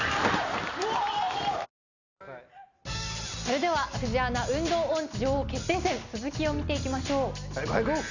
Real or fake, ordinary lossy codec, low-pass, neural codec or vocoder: real; none; 7.2 kHz; none